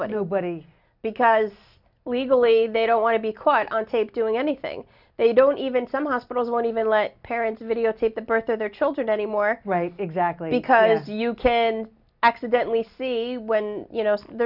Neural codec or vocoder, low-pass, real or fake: none; 5.4 kHz; real